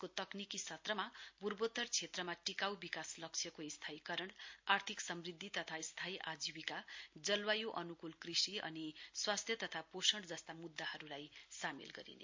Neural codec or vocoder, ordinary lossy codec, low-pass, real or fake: none; MP3, 48 kbps; 7.2 kHz; real